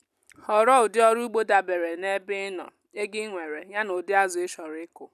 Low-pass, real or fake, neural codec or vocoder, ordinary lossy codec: 14.4 kHz; real; none; none